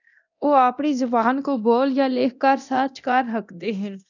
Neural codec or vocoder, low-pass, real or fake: codec, 24 kHz, 0.9 kbps, DualCodec; 7.2 kHz; fake